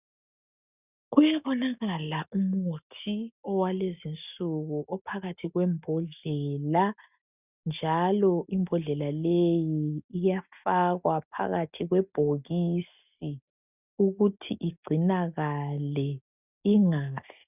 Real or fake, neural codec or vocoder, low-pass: real; none; 3.6 kHz